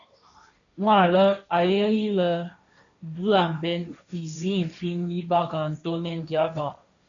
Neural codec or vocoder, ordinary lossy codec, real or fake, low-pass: codec, 16 kHz, 1.1 kbps, Voila-Tokenizer; MP3, 96 kbps; fake; 7.2 kHz